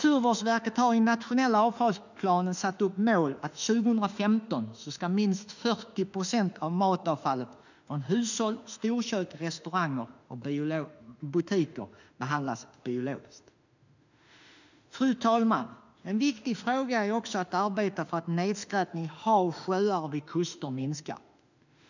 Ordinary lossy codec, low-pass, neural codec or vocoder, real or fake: none; 7.2 kHz; autoencoder, 48 kHz, 32 numbers a frame, DAC-VAE, trained on Japanese speech; fake